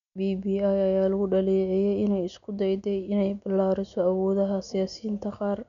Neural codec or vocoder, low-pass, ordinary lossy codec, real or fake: none; 7.2 kHz; none; real